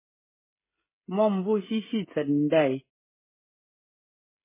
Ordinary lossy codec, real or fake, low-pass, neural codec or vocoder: MP3, 16 kbps; fake; 3.6 kHz; codec, 16 kHz, 16 kbps, FreqCodec, smaller model